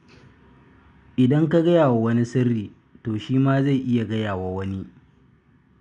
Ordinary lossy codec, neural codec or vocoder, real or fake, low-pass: none; none; real; 9.9 kHz